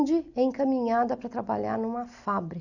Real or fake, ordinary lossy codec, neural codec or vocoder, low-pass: real; none; none; 7.2 kHz